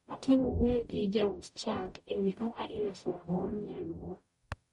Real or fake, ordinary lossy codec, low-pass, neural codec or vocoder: fake; MP3, 48 kbps; 19.8 kHz; codec, 44.1 kHz, 0.9 kbps, DAC